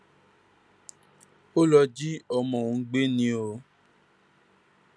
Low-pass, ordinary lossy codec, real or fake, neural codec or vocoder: none; none; real; none